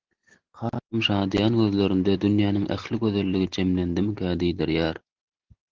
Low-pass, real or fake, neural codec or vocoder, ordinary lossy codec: 7.2 kHz; real; none; Opus, 16 kbps